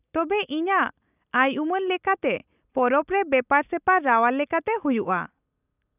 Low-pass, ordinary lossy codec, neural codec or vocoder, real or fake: 3.6 kHz; none; none; real